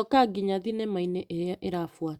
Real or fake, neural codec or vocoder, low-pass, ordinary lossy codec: real; none; 19.8 kHz; Opus, 64 kbps